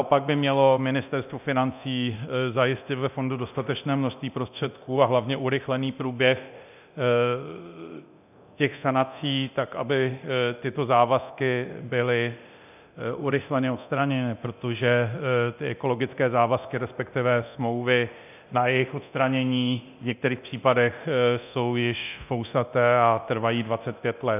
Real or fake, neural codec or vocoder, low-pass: fake; codec, 24 kHz, 0.9 kbps, DualCodec; 3.6 kHz